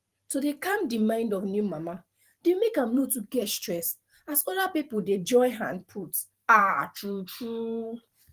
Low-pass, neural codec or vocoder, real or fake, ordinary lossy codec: 14.4 kHz; vocoder, 44.1 kHz, 128 mel bands every 512 samples, BigVGAN v2; fake; Opus, 24 kbps